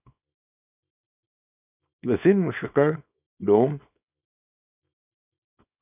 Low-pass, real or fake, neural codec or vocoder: 3.6 kHz; fake; codec, 24 kHz, 0.9 kbps, WavTokenizer, small release